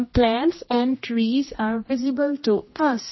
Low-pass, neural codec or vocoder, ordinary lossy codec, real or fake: 7.2 kHz; codec, 16 kHz, 1 kbps, X-Codec, HuBERT features, trained on general audio; MP3, 24 kbps; fake